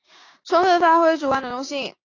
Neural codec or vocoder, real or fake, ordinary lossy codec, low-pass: codec, 16 kHz, 6 kbps, DAC; fake; AAC, 32 kbps; 7.2 kHz